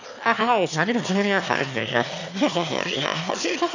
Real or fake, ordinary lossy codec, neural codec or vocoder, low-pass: fake; none; autoencoder, 22.05 kHz, a latent of 192 numbers a frame, VITS, trained on one speaker; 7.2 kHz